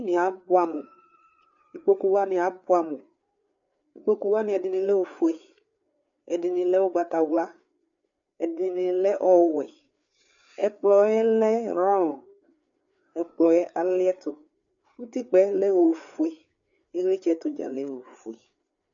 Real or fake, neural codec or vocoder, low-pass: fake; codec, 16 kHz, 4 kbps, FreqCodec, larger model; 7.2 kHz